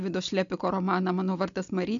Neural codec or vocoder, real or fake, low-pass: none; real; 7.2 kHz